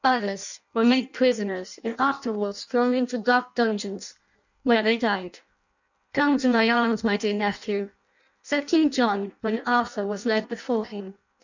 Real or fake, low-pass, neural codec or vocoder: fake; 7.2 kHz; codec, 16 kHz in and 24 kHz out, 0.6 kbps, FireRedTTS-2 codec